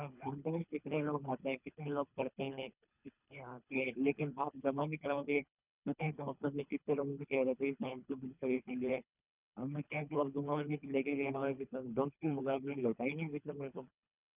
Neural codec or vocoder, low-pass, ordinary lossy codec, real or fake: codec, 24 kHz, 3 kbps, HILCodec; 3.6 kHz; none; fake